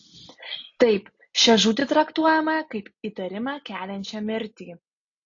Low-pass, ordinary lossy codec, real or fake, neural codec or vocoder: 7.2 kHz; AAC, 48 kbps; real; none